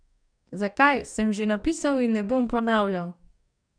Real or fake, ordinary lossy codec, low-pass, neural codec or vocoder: fake; none; 9.9 kHz; codec, 44.1 kHz, 2.6 kbps, DAC